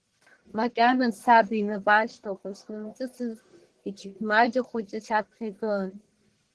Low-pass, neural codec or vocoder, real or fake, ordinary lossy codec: 10.8 kHz; codec, 44.1 kHz, 1.7 kbps, Pupu-Codec; fake; Opus, 16 kbps